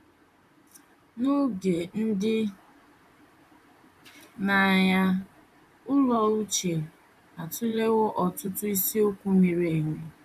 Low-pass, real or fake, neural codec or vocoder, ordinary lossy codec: 14.4 kHz; fake; vocoder, 44.1 kHz, 128 mel bands, Pupu-Vocoder; none